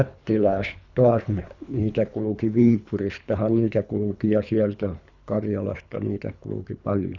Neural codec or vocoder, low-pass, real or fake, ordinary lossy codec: codec, 24 kHz, 3 kbps, HILCodec; 7.2 kHz; fake; none